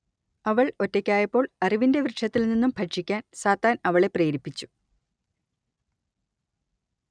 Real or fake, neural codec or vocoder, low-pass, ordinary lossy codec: real; none; 9.9 kHz; none